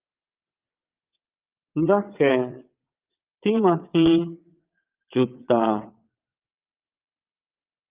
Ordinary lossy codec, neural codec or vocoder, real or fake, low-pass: Opus, 24 kbps; vocoder, 22.05 kHz, 80 mel bands, WaveNeXt; fake; 3.6 kHz